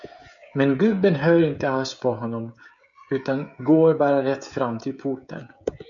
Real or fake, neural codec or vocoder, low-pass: fake; codec, 16 kHz, 16 kbps, FreqCodec, smaller model; 7.2 kHz